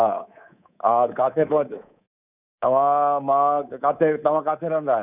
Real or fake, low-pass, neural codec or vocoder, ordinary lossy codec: fake; 3.6 kHz; codec, 24 kHz, 3.1 kbps, DualCodec; none